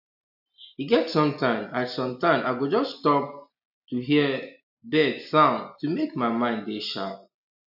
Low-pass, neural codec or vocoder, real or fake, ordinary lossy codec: 5.4 kHz; none; real; AAC, 48 kbps